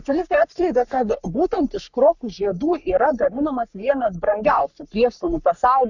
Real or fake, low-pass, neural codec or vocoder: fake; 7.2 kHz; codec, 44.1 kHz, 3.4 kbps, Pupu-Codec